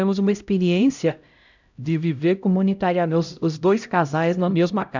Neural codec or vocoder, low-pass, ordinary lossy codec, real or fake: codec, 16 kHz, 0.5 kbps, X-Codec, HuBERT features, trained on LibriSpeech; 7.2 kHz; none; fake